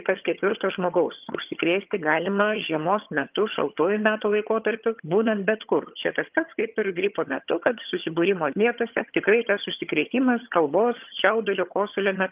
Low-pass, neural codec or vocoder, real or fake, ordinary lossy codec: 3.6 kHz; vocoder, 22.05 kHz, 80 mel bands, HiFi-GAN; fake; Opus, 24 kbps